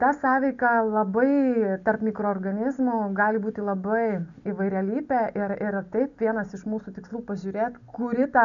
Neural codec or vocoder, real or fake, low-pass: none; real; 7.2 kHz